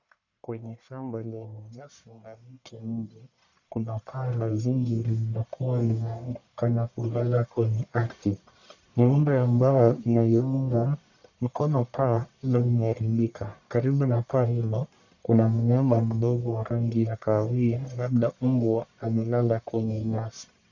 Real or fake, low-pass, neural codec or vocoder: fake; 7.2 kHz; codec, 44.1 kHz, 1.7 kbps, Pupu-Codec